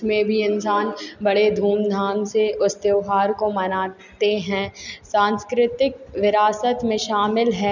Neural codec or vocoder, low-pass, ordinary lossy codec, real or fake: none; 7.2 kHz; none; real